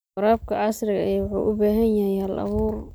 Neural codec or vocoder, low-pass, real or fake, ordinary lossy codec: none; none; real; none